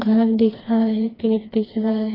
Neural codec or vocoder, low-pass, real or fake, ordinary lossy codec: codec, 16 kHz, 2 kbps, FreqCodec, smaller model; 5.4 kHz; fake; AAC, 24 kbps